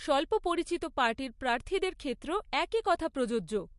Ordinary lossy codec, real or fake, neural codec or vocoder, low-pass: MP3, 48 kbps; real; none; 14.4 kHz